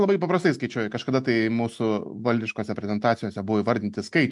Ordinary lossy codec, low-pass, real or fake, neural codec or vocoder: MP3, 64 kbps; 10.8 kHz; real; none